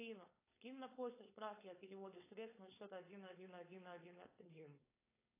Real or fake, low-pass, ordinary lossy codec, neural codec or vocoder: fake; 3.6 kHz; AAC, 16 kbps; codec, 16 kHz, 4.8 kbps, FACodec